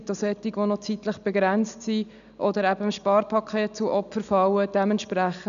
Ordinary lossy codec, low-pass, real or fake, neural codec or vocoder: none; 7.2 kHz; real; none